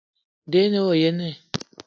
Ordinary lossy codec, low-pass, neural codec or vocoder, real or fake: AAC, 48 kbps; 7.2 kHz; none; real